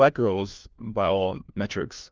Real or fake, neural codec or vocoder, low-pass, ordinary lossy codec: fake; codec, 16 kHz, 1 kbps, FunCodec, trained on LibriTTS, 50 frames a second; 7.2 kHz; Opus, 32 kbps